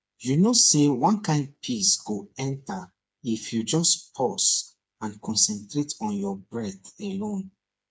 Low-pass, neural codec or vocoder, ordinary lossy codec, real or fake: none; codec, 16 kHz, 4 kbps, FreqCodec, smaller model; none; fake